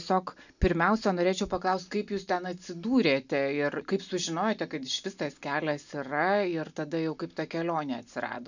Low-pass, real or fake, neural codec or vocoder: 7.2 kHz; real; none